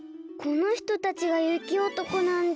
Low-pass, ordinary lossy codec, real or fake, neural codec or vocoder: none; none; real; none